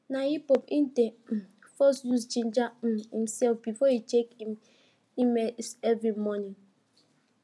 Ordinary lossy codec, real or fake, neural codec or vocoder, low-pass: none; real; none; none